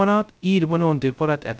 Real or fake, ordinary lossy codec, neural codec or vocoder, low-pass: fake; none; codec, 16 kHz, 0.2 kbps, FocalCodec; none